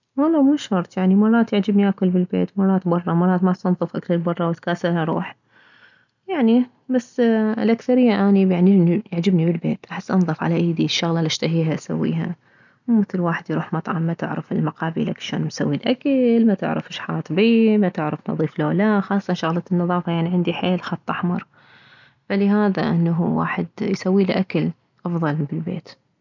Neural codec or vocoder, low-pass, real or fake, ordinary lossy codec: none; 7.2 kHz; real; none